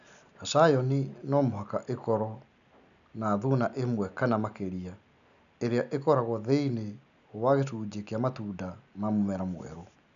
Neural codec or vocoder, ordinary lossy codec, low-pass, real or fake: none; none; 7.2 kHz; real